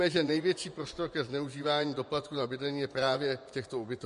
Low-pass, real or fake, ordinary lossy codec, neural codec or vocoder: 10.8 kHz; fake; MP3, 48 kbps; vocoder, 24 kHz, 100 mel bands, Vocos